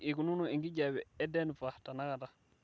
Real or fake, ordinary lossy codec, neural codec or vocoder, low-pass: real; none; none; none